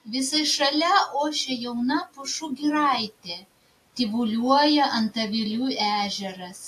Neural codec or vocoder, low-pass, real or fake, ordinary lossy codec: none; 14.4 kHz; real; AAC, 64 kbps